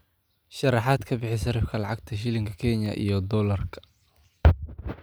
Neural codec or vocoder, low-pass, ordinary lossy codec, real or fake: none; none; none; real